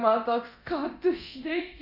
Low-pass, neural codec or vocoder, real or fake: 5.4 kHz; codec, 24 kHz, 0.9 kbps, DualCodec; fake